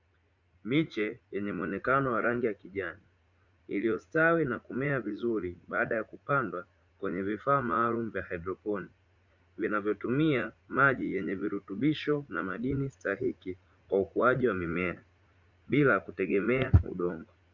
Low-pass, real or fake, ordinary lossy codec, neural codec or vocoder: 7.2 kHz; fake; Opus, 64 kbps; vocoder, 44.1 kHz, 80 mel bands, Vocos